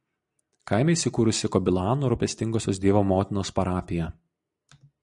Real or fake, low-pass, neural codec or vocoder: real; 10.8 kHz; none